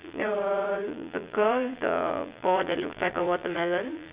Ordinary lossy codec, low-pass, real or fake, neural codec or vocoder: Opus, 64 kbps; 3.6 kHz; fake; vocoder, 22.05 kHz, 80 mel bands, Vocos